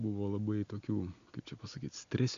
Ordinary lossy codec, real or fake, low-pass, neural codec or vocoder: MP3, 64 kbps; real; 7.2 kHz; none